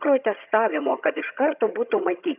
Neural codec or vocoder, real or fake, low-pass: vocoder, 22.05 kHz, 80 mel bands, HiFi-GAN; fake; 3.6 kHz